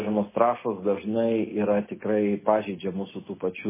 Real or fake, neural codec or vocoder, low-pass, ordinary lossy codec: real; none; 3.6 kHz; MP3, 16 kbps